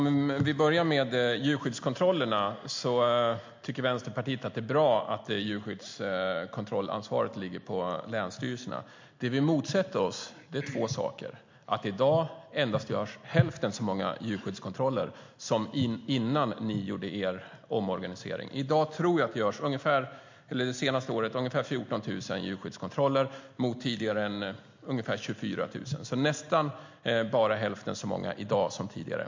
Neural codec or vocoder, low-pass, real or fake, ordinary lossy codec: none; 7.2 kHz; real; MP3, 48 kbps